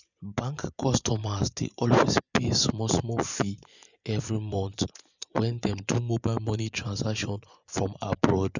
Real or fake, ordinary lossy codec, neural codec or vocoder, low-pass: fake; none; vocoder, 24 kHz, 100 mel bands, Vocos; 7.2 kHz